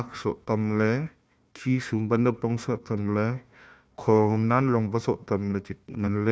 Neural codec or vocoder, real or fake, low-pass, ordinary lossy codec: codec, 16 kHz, 1 kbps, FunCodec, trained on Chinese and English, 50 frames a second; fake; none; none